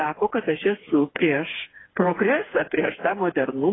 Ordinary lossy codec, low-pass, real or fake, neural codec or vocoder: AAC, 16 kbps; 7.2 kHz; fake; codec, 16 kHz, 4 kbps, FreqCodec, smaller model